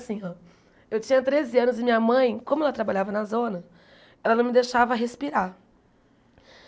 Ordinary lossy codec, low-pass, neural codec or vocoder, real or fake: none; none; none; real